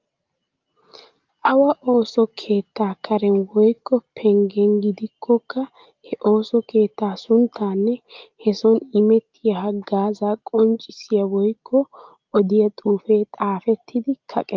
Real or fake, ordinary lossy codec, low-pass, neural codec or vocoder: real; Opus, 24 kbps; 7.2 kHz; none